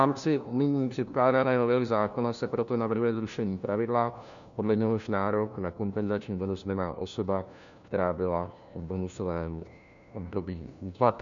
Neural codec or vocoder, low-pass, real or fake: codec, 16 kHz, 1 kbps, FunCodec, trained on LibriTTS, 50 frames a second; 7.2 kHz; fake